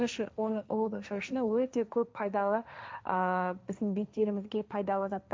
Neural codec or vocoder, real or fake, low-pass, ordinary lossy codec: codec, 16 kHz, 1.1 kbps, Voila-Tokenizer; fake; none; none